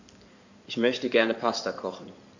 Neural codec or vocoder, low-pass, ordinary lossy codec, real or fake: codec, 16 kHz in and 24 kHz out, 2.2 kbps, FireRedTTS-2 codec; 7.2 kHz; none; fake